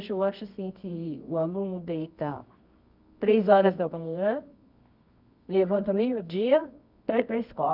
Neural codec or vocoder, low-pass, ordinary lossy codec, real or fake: codec, 24 kHz, 0.9 kbps, WavTokenizer, medium music audio release; 5.4 kHz; none; fake